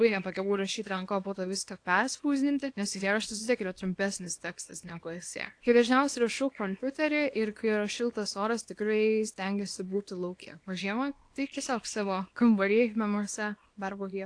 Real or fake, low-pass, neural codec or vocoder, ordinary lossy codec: fake; 9.9 kHz; codec, 24 kHz, 0.9 kbps, WavTokenizer, small release; AAC, 48 kbps